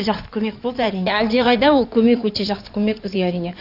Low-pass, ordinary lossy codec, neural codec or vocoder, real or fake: 5.4 kHz; none; codec, 16 kHz in and 24 kHz out, 2.2 kbps, FireRedTTS-2 codec; fake